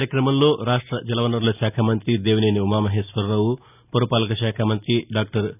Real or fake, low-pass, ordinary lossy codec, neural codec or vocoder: real; 3.6 kHz; none; none